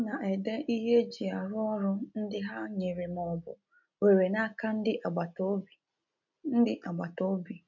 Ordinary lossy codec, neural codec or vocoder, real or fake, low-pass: none; none; real; 7.2 kHz